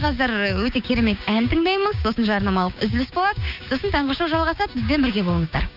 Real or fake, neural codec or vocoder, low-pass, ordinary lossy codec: fake; codec, 16 kHz, 6 kbps, DAC; 5.4 kHz; none